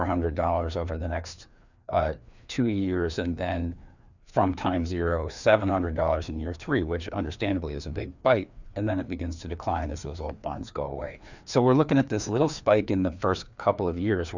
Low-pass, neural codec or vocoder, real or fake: 7.2 kHz; codec, 16 kHz, 2 kbps, FreqCodec, larger model; fake